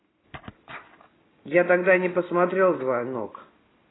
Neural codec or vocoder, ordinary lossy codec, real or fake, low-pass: none; AAC, 16 kbps; real; 7.2 kHz